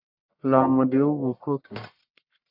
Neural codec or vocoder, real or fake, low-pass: codec, 44.1 kHz, 1.7 kbps, Pupu-Codec; fake; 5.4 kHz